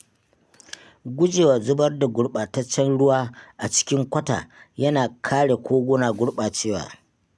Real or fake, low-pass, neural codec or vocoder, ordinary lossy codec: real; none; none; none